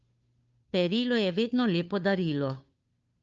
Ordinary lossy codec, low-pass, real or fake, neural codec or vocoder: Opus, 24 kbps; 7.2 kHz; fake; codec, 16 kHz, 2 kbps, FunCodec, trained on Chinese and English, 25 frames a second